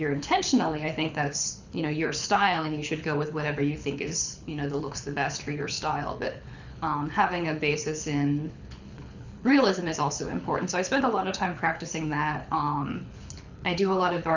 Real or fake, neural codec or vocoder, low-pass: fake; codec, 24 kHz, 6 kbps, HILCodec; 7.2 kHz